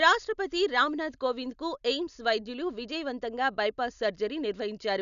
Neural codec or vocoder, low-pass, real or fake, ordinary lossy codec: none; 7.2 kHz; real; none